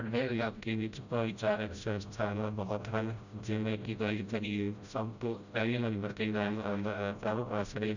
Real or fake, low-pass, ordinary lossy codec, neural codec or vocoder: fake; 7.2 kHz; none; codec, 16 kHz, 0.5 kbps, FreqCodec, smaller model